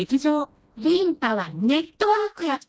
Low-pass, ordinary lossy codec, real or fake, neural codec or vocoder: none; none; fake; codec, 16 kHz, 1 kbps, FreqCodec, smaller model